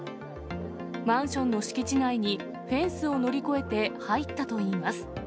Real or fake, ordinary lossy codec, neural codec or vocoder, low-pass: real; none; none; none